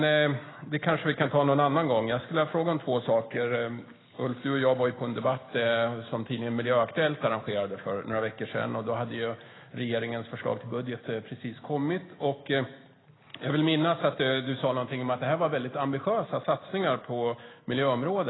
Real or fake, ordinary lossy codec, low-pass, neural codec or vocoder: real; AAC, 16 kbps; 7.2 kHz; none